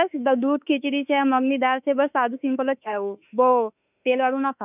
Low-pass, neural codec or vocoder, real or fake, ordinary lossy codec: 3.6 kHz; autoencoder, 48 kHz, 32 numbers a frame, DAC-VAE, trained on Japanese speech; fake; none